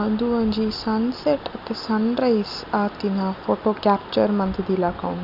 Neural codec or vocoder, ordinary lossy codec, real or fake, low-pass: none; none; real; 5.4 kHz